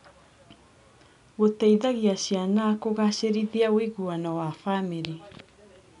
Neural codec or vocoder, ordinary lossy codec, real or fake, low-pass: none; none; real; 10.8 kHz